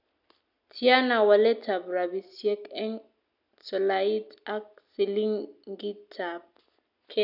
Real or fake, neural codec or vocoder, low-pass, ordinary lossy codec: real; none; 5.4 kHz; none